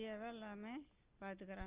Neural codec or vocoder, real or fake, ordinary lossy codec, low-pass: none; real; Opus, 24 kbps; 3.6 kHz